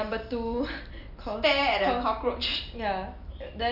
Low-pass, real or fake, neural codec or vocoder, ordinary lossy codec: 5.4 kHz; real; none; none